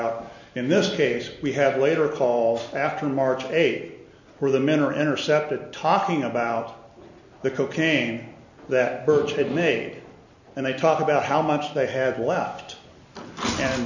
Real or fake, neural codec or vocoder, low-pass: real; none; 7.2 kHz